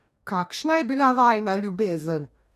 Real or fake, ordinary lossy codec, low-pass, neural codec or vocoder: fake; none; 14.4 kHz; codec, 44.1 kHz, 2.6 kbps, DAC